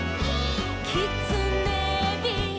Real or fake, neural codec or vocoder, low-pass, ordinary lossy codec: real; none; none; none